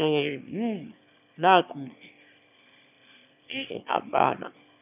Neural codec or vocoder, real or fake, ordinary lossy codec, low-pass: autoencoder, 22.05 kHz, a latent of 192 numbers a frame, VITS, trained on one speaker; fake; none; 3.6 kHz